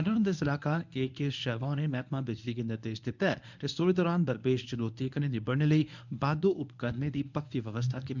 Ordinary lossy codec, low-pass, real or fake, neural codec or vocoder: none; 7.2 kHz; fake; codec, 24 kHz, 0.9 kbps, WavTokenizer, medium speech release version 1